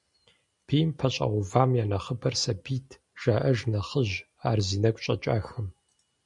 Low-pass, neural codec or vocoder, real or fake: 10.8 kHz; none; real